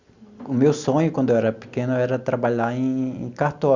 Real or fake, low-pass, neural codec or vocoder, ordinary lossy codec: real; 7.2 kHz; none; Opus, 64 kbps